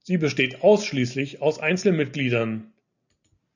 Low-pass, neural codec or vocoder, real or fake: 7.2 kHz; none; real